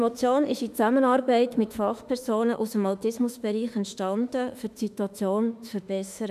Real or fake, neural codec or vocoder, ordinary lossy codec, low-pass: fake; autoencoder, 48 kHz, 32 numbers a frame, DAC-VAE, trained on Japanese speech; none; 14.4 kHz